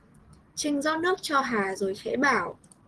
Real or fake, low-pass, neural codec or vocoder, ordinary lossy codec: real; 10.8 kHz; none; Opus, 16 kbps